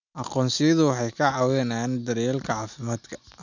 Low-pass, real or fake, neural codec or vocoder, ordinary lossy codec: 7.2 kHz; real; none; none